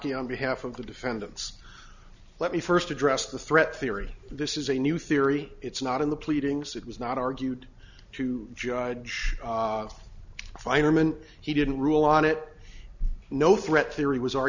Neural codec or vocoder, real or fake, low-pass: none; real; 7.2 kHz